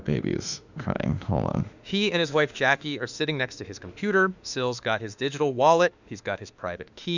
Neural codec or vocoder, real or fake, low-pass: autoencoder, 48 kHz, 32 numbers a frame, DAC-VAE, trained on Japanese speech; fake; 7.2 kHz